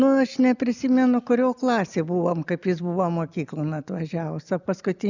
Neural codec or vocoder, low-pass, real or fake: none; 7.2 kHz; real